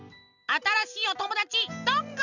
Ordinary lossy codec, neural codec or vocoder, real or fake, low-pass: none; none; real; 7.2 kHz